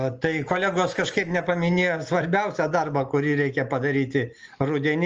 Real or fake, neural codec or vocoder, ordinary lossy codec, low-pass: real; none; Opus, 24 kbps; 7.2 kHz